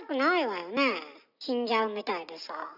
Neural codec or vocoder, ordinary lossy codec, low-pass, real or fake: none; none; 5.4 kHz; real